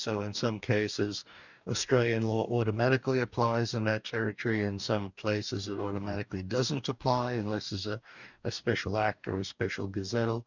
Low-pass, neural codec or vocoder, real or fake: 7.2 kHz; codec, 44.1 kHz, 2.6 kbps, DAC; fake